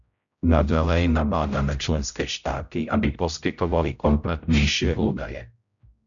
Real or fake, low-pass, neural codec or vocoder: fake; 7.2 kHz; codec, 16 kHz, 0.5 kbps, X-Codec, HuBERT features, trained on general audio